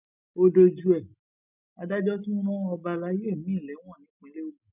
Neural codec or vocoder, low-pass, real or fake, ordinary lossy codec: none; 3.6 kHz; real; none